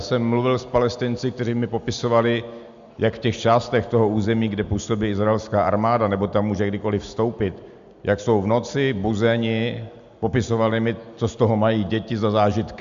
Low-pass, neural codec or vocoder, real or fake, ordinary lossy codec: 7.2 kHz; none; real; MP3, 64 kbps